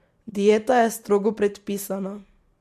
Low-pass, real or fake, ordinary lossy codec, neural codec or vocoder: 14.4 kHz; real; MP3, 64 kbps; none